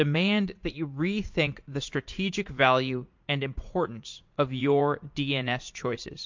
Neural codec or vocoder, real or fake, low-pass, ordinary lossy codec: vocoder, 44.1 kHz, 80 mel bands, Vocos; fake; 7.2 kHz; MP3, 48 kbps